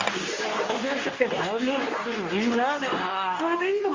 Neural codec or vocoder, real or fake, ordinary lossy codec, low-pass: codec, 24 kHz, 0.9 kbps, WavTokenizer, medium speech release version 2; fake; Opus, 32 kbps; 7.2 kHz